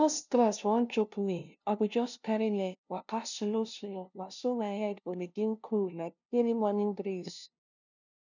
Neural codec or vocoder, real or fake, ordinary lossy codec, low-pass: codec, 16 kHz, 0.5 kbps, FunCodec, trained on LibriTTS, 25 frames a second; fake; none; 7.2 kHz